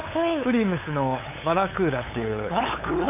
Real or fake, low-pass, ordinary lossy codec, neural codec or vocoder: fake; 3.6 kHz; none; codec, 16 kHz, 8 kbps, FunCodec, trained on LibriTTS, 25 frames a second